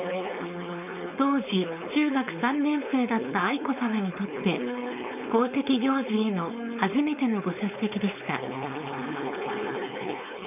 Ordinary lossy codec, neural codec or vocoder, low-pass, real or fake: none; codec, 16 kHz, 4.8 kbps, FACodec; 3.6 kHz; fake